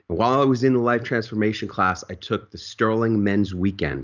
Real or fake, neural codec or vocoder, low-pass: real; none; 7.2 kHz